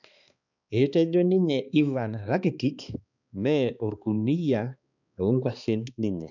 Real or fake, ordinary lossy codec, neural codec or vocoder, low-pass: fake; none; codec, 16 kHz, 2 kbps, X-Codec, HuBERT features, trained on balanced general audio; 7.2 kHz